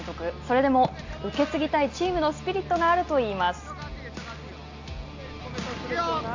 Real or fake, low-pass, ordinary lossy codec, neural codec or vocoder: real; 7.2 kHz; AAC, 48 kbps; none